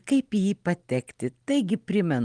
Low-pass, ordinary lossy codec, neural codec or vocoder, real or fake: 9.9 kHz; Opus, 24 kbps; none; real